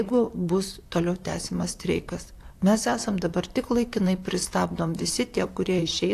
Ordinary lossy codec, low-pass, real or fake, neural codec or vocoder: AAC, 64 kbps; 14.4 kHz; fake; vocoder, 44.1 kHz, 128 mel bands, Pupu-Vocoder